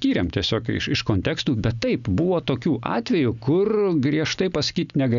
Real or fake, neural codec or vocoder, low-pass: real; none; 7.2 kHz